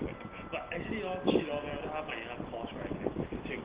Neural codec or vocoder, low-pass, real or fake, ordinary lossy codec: none; 3.6 kHz; real; Opus, 16 kbps